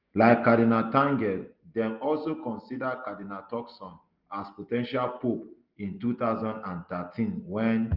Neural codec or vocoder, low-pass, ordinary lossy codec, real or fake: none; 5.4 kHz; Opus, 32 kbps; real